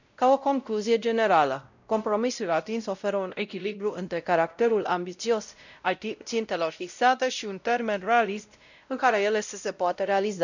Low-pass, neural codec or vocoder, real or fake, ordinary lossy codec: 7.2 kHz; codec, 16 kHz, 0.5 kbps, X-Codec, WavLM features, trained on Multilingual LibriSpeech; fake; none